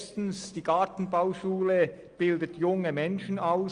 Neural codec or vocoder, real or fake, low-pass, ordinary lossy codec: none; real; 9.9 kHz; Opus, 32 kbps